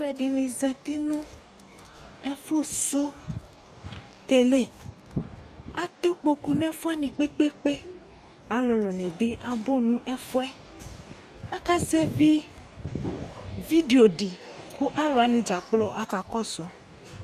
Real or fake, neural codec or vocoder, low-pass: fake; codec, 44.1 kHz, 2.6 kbps, DAC; 14.4 kHz